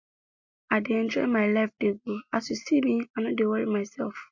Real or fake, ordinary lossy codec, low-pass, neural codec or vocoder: real; MP3, 48 kbps; 7.2 kHz; none